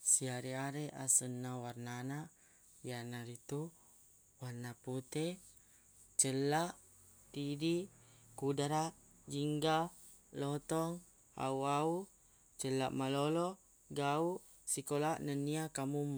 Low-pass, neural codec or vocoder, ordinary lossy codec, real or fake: none; none; none; real